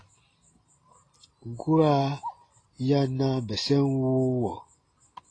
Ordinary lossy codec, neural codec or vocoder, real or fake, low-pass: AAC, 32 kbps; none; real; 9.9 kHz